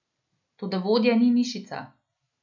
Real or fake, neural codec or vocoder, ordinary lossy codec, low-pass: real; none; none; 7.2 kHz